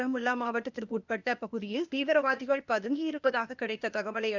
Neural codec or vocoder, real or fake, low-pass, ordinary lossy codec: codec, 16 kHz, 0.8 kbps, ZipCodec; fake; 7.2 kHz; none